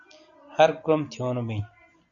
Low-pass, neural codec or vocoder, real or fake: 7.2 kHz; none; real